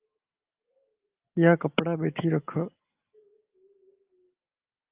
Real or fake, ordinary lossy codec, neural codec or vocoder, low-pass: real; Opus, 24 kbps; none; 3.6 kHz